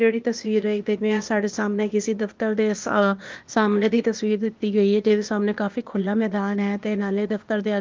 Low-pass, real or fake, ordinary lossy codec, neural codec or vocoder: 7.2 kHz; fake; Opus, 32 kbps; codec, 16 kHz, 0.8 kbps, ZipCodec